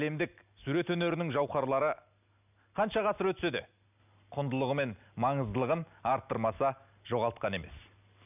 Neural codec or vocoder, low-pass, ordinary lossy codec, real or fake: none; 3.6 kHz; none; real